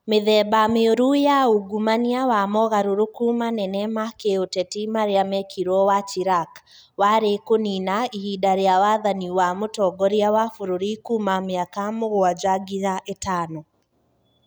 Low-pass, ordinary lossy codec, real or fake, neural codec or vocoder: none; none; real; none